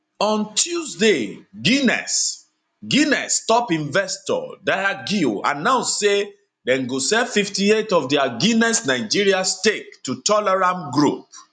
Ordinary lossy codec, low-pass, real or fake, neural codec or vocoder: none; 9.9 kHz; real; none